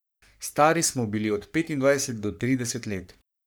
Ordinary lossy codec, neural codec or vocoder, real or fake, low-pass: none; codec, 44.1 kHz, 3.4 kbps, Pupu-Codec; fake; none